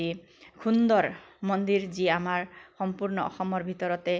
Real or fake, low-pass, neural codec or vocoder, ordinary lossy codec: real; none; none; none